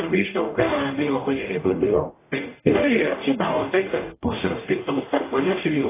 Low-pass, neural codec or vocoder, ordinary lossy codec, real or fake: 3.6 kHz; codec, 44.1 kHz, 0.9 kbps, DAC; AAC, 16 kbps; fake